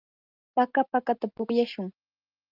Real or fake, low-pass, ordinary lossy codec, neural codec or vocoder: real; 5.4 kHz; Opus, 32 kbps; none